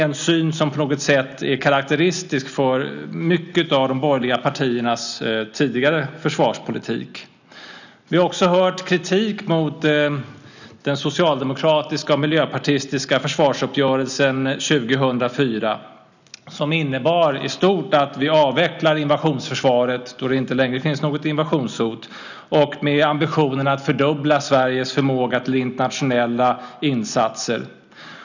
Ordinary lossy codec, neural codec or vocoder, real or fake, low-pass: none; none; real; 7.2 kHz